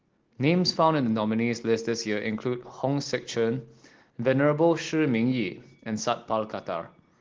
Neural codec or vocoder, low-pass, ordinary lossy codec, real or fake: none; 7.2 kHz; Opus, 16 kbps; real